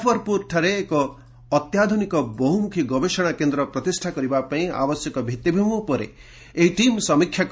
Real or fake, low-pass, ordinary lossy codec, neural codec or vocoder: real; none; none; none